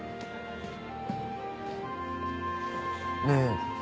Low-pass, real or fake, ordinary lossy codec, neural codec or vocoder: none; real; none; none